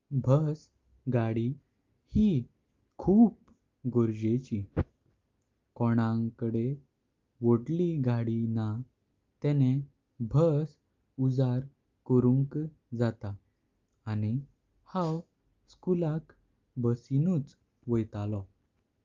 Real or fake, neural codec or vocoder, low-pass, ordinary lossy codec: real; none; 7.2 kHz; Opus, 32 kbps